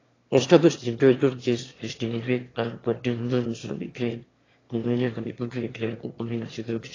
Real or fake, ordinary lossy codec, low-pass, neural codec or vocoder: fake; AAC, 32 kbps; 7.2 kHz; autoencoder, 22.05 kHz, a latent of 192 numbers a frame, VITS, trained on one speaker